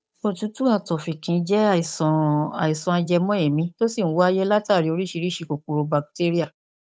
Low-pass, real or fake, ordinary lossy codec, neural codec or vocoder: none; fake; none; codec, 16 kHz, 8 kbps, FunCodec, trained on Chinese and English, 25 frames a second